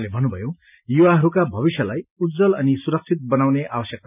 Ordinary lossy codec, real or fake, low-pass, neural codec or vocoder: none; real; 3.6 kHz; none